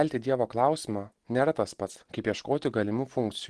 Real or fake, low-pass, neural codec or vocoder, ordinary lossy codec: real; 10.8 kHz; none; Opus, 16 kbps